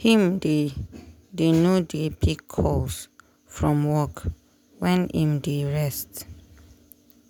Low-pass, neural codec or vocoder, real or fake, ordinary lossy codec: 19.8 kHz; none; real; none